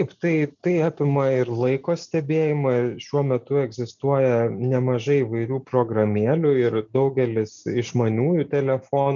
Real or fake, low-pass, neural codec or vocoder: real; 7.2 kHz; none